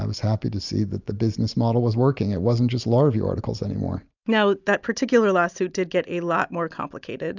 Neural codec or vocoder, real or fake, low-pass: none; real; 7.2 kHz